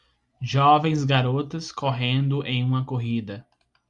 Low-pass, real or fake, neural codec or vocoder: 10.8 kHz; real; none